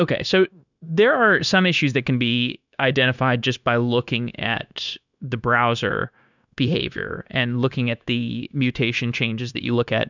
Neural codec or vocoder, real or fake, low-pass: codec, 16 kHz, 0.9 kbps, LongCat-Audio-Codec; fake; 7.2 kHz